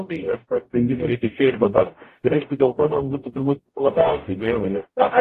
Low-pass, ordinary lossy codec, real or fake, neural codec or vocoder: 14.4 kHz; AAC, 48 kbps; fake; codec, 44.1 kHz, 0.9 kbps, DAC